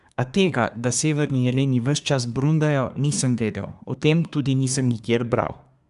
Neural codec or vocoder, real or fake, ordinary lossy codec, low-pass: codec, 24 kHz, 1 kbps, SNAC; fake; none; 10.8 kHz